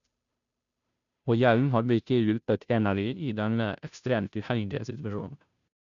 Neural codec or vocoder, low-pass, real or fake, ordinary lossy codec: codec, 16 kHz, 0.5 kbps, FunCodec, trained on Chinese and English, 25 frames a second; 7.2 kHz; fake; none